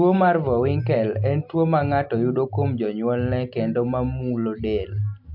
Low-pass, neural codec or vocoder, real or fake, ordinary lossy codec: 5.4 kHz; none; real; MP3, 48 kbps